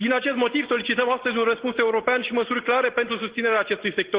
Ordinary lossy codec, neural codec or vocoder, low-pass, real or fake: Opus, 16 kbps; none; 3.6 kHz; real